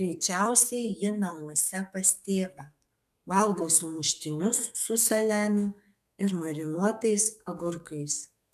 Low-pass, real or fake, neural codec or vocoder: 14.4 kHz; fake; codec, 32 kHz, 1.9 kbps, SNAC